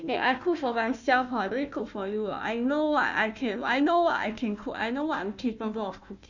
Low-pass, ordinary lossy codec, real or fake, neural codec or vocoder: 7.2 kHz; none; fake; codec, 16 kHz, 1 kbps, FunCodec, trained on Chinese and English, 50 frames a second